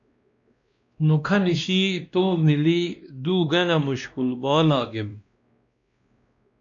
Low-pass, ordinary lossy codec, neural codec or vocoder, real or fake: 7.2 kHz; MP3, 64 kbps; codec, 16 kHz, 1 kbps, X-Codec, WavLM features, trained on Multilingual LibriSpeech; fake